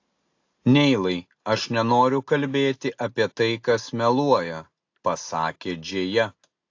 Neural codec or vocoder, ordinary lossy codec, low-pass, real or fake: none; AAC, 48 kbps; 7.2 kHz; real